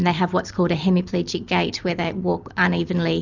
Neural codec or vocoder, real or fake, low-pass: none; real; 7.2 kHz